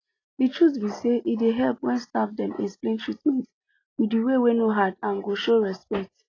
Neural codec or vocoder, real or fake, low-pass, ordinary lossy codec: none; real; 7.2 kHz; AAC, 32 kbps